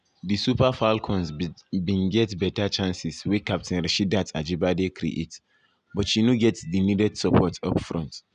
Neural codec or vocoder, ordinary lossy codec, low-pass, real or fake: none; none; 9.9 kHz; real